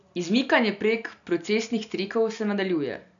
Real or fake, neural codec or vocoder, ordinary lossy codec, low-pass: real; none; none; 7.2 kHz